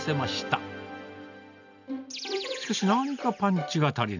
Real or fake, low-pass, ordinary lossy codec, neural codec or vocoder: real; 7.2 kHz; none; none